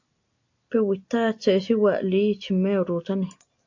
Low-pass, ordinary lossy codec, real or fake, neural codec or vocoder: 7.2 kHz; AAC, 48 kbps; real; none